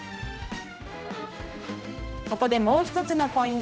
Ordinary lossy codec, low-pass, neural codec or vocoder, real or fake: none; none; codec, 16 kHz, 1 kbps, X-Codec, HuBERT features, trained on general audio; fake